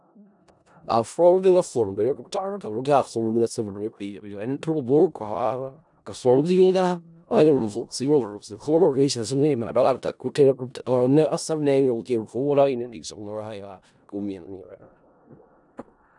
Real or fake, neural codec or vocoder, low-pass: fake; codec, 16 kHz in and 24 kHz out, 0.4 kbps, LongCat-Audio-Codec, four codebook decoder; 10.8 kHz